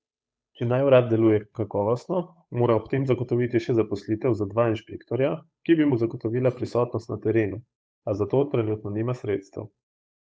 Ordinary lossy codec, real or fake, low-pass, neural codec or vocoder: none; fake; none; codec, 16 kHz, 8 kbps, FunCodec, trained on Chinese and English, 25 frames a second